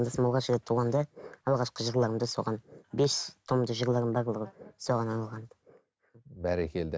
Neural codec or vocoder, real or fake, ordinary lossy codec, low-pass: none; real; none; none